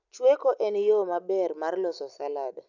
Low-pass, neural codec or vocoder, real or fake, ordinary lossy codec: 7.2 kHz; none; real; none